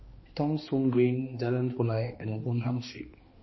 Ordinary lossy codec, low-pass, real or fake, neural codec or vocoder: MP3, 24 kbps; 7.2 kHz; fake; codec, 16 kHz, 2 kbps, X-Codec, HuBERT features, trained on balanced general audio